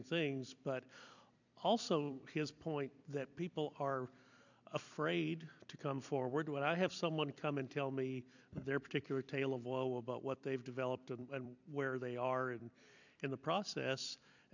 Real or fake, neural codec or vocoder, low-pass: fake; vocoder, 44.1 kHz, 128 mel bands every 256 samples, BigVGAN v2; 7.2 kHz